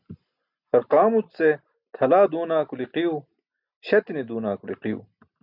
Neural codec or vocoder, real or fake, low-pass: none; real; 5.4 kHz